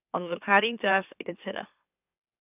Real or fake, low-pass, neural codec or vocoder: fake; 3.6 kHz; autoencoder, 44.1 kHz, a latent of 192 numbers a frame, MeloTTS